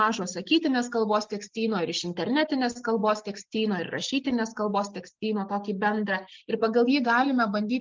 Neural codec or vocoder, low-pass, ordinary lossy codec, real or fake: none; 7.2 kHz; Opus, 24 kbps; real